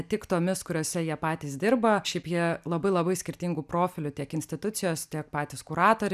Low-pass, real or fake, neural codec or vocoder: 14.4 kHz; real; none